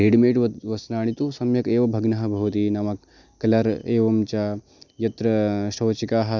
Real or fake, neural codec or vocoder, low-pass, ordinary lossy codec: real; none; 7.2 kHz; none